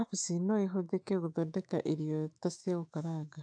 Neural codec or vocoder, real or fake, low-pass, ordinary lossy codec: autoencoder, 48 kHz, 128 numbers a frame, DAC-VAE, trained on Japanese speech; fake; 9.9 kHz; AAC, 64 kbps